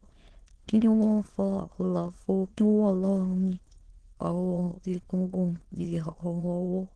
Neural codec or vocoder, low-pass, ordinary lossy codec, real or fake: autoencoder, 22.05 kHz, a latent of 192 numbers a frame, VITS, trained on many speakers; 9.9 kHz; Opus, 16 kbps; fake